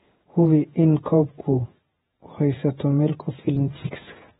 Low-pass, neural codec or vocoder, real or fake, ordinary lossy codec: 19.8 kHz; vocoder, 48 kHz, 128 mel bands, Vocos; fake; AAC, 16 kbps